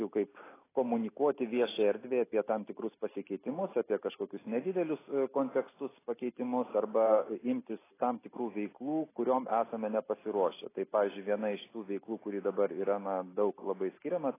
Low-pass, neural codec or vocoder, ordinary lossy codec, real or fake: 3.6 kHz; none; AAC, 16 kbps; real